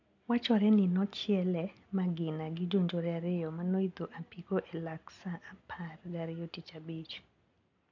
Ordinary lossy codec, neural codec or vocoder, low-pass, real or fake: none; none; 7.2 kHz; real